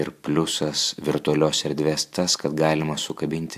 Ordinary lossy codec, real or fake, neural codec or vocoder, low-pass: AAC, 96 kbps; real; none; 14.4 kHz